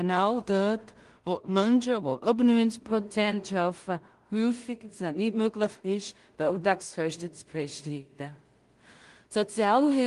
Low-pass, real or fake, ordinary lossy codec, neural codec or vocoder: 10.8 kHz; fake; Opus, 24 kbps; codec, 16 kHz in and 24 kHz out, 0.4 kbps, LongCat-Audio-Codec, two codebook decoder